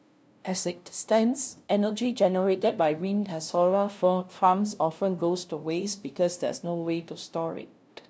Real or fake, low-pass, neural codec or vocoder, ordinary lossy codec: fake; none; codec, 16 kHz, 0.5 kbps, FunCodec, trained on LibriTTS, 25 frames a second; none